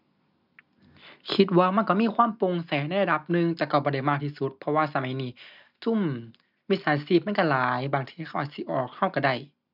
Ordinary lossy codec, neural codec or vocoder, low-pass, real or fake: none; none; 5.4 kHz; real